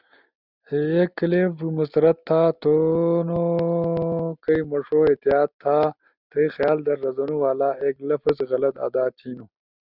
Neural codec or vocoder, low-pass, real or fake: none; 5.4 kHz; real